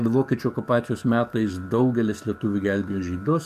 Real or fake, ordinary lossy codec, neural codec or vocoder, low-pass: fake; MP3, 96 kbps; codec, 44.1 kHz, 7.8 kbps, DAC; 14.4 kHz